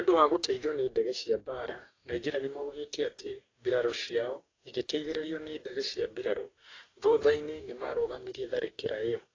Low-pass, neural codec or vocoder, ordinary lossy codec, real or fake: 7.2 kHz; codec, 44.1 kHz, 2.6 kbps, DAC; AAC, 32 kbps; fake